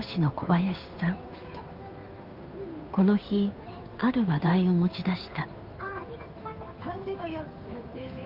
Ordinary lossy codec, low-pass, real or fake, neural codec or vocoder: Opus, 24 kbps; 5.4 kHz; fake; codec, 16 kHz, 2 kbps, FunCodec, trained on Chinese and English, 25 frames a second